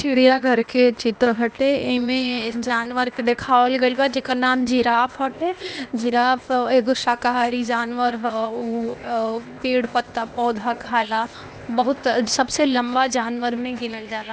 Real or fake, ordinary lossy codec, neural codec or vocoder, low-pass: fake; none; codec, 16 kHz, 0.8 kbps, ZipCodec; none